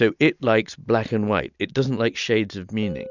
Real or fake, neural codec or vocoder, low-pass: real; none; 7.2 kHz